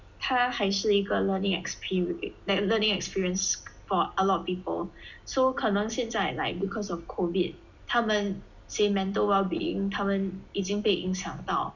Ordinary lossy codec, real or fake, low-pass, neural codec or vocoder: none; real; 7.2 kHz; none